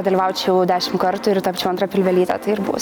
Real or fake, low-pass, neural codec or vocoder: real; 19.8 kHz; none